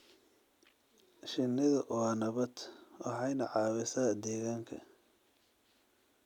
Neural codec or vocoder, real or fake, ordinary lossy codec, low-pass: none; real; none; 19.8 kHz